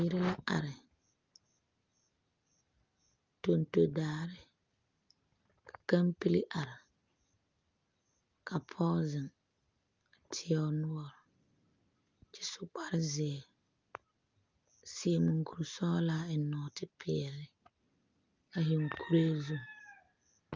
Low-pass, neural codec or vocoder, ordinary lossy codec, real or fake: 7.2 kHz; none; Opus, 24 kbps; real